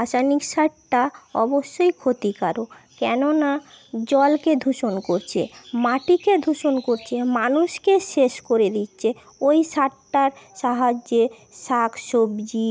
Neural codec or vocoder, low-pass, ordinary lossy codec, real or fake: none; none; none; real